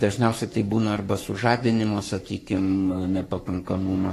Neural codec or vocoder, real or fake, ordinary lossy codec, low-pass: codec, 44.1 kHz, 3.4 kbps, Pupu-Codec; fake; AAC, 48 kbps; 14.4 kHz